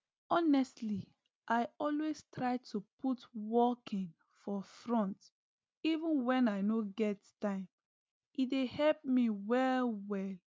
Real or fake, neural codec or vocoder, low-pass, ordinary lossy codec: real; none; none; none